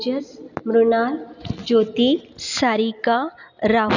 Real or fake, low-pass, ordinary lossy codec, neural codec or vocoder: real; 7.2 kHz; none; none